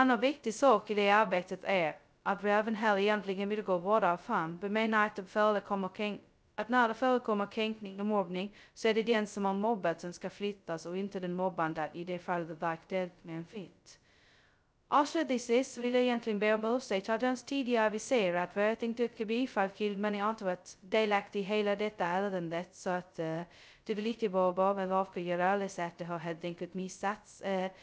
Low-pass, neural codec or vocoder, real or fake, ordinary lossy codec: none; codec, 16 kHz, 0.2 kbps, FocalCodec; fake; none